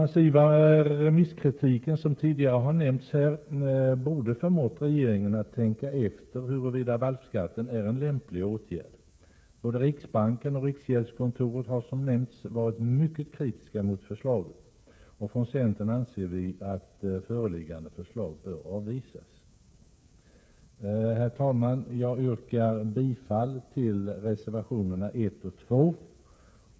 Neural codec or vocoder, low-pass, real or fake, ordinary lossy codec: codec, 16 kHz, 8 kbps, FreqCodec, smaller model; none; fake; none